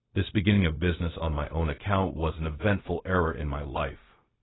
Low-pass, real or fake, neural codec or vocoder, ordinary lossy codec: 7.2 kHz; fake; codec, 16 kHz, 0.4 kbps, LongCat-Audio-Codec; AAC, 16 kbps